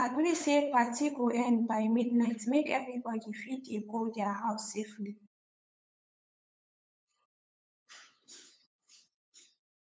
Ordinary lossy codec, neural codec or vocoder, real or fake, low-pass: none; codec, 16 kHz, 8 kbps, FunCodec, trained on LibriTTS, 25 frames a second; fake; none